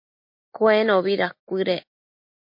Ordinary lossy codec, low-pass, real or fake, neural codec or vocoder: MP3, 32 kbps; 5.4 kHz; real; none